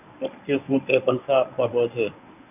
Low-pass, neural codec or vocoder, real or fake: 3.6 kHz; codec, 24 kHz, 0.9 kbps, WavTokenizer, medium speech release version 1; fake